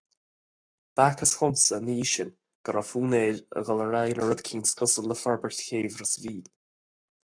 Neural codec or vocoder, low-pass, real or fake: codec, 44.1 kHz, 7.8 kbps, Pupu-Codec; 9.9 kHz; fake